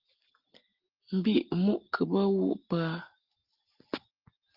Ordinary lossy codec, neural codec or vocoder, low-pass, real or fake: Opus, 16 kbps; none; 5.4 kHz; real